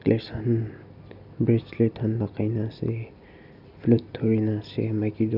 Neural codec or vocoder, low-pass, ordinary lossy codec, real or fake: none; 5.4 kHz; none; real